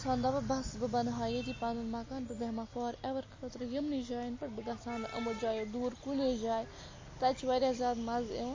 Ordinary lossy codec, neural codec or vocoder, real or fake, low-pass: MP3, 32 kbps; none; real; 7.2 kHz